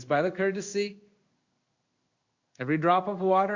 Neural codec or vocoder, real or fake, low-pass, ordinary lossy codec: codec, 16 kHz in and 24 kHz out, 1 kbps, XY-Tokenizer; fake; 7.2 kHz; Opus, 64 kbps